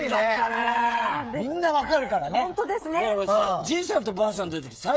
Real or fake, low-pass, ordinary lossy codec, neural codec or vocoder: fake; none; none; codec, 16 kHz, 8 kbps, FreqCodec, smaller model